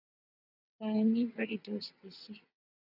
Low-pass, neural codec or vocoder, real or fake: 5.4 kHz; vocoder, 22.05 kHz, 80 mel bands, WaveNeXt; fake